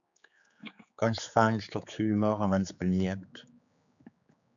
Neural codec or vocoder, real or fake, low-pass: codec, 16 kHz, 4 kbps, X-Codec, HuBERT features, trained on general audio; fake; 7.2 kHz